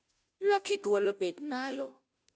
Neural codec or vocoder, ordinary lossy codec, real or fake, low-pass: codec, 16 kHz, 0.5 kbps, FunCodec, trained on Chinese and English, 25 frames a second; none; fake; none